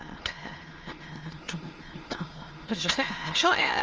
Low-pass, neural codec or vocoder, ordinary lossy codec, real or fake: 7.2 kHz; autoencoder, 22.05 kHz, a latent of 192 numbers a frame, VITS, trained on many speakers; Opus, 24 kbps; fake